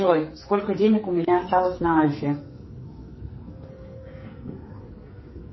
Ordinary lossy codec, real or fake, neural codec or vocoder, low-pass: MP3, 24 kbps; fake; codec, 44.1 kHz, 2.6 kbps, SNAC; 7.2 kHz